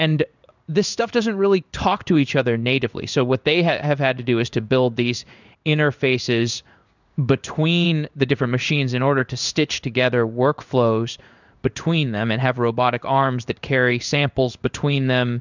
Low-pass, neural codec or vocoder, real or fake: 7.2 kHz; codec, 16 kHz in and 24 kHz out, 1 kbps, XY-Tokenizer; fake